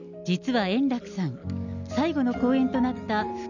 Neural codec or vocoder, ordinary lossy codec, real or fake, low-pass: none; none; real; 7.2 kHz